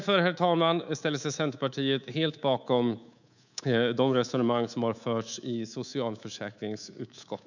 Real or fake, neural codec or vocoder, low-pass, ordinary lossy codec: fake; codec, 24 kHz, 3.1 kbps, DualCodec; 7.2 kHz; none